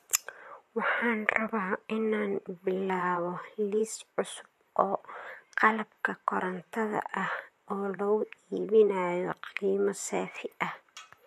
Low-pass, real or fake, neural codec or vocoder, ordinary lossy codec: 14.4 kHz; fake; vocoder, 44.1 kHz, 128 mel bands, Pupu-Vocoder; MP3, 64 kbps